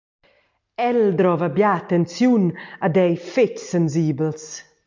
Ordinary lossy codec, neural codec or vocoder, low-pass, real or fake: MP3, 64 kbps; none; 7.2 kHz; real